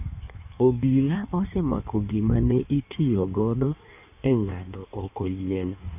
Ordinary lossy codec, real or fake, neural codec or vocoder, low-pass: none; fake; codec, 16 kHz in and 24 kHz out, 1.1 kbps, FireRedTTS-2 codec; 3.6 kHz